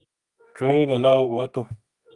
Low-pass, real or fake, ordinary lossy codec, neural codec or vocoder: 10.8 kHz; fake; Opus, 32 kbps; codec, 24 kHz, 0.9 kbps, WavTokenizer, medium music audio release